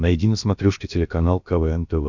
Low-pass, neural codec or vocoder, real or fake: 7.2 kHz; codec, 16 kHz, about 1 kbps, DyCAST, with the encoder's durations; fake